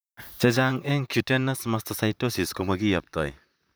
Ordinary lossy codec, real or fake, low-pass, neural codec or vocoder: none; fake; none; vocoder, 44.1 kHz, 128 mel bands, Pupu-Vocoder